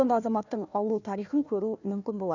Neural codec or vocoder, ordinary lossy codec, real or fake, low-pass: codec, 16 kHz, 1 kbps, FunCodec, trained on Chinese and English, 50 frames a second; none; fake; 7.2 kHz